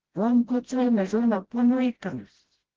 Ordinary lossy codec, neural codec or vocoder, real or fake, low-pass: Opus, 16 kbps; codec, 16 kHz, 0.5 kbps, FreqCodec, smaller model; fake; 7.2 kHz